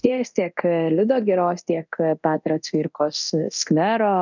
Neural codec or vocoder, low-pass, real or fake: codec, 24 kHz, 0.9 kbps, DualCodec; 7.2 kHz; fake